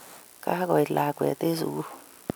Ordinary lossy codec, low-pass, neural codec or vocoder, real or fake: none; none; none; real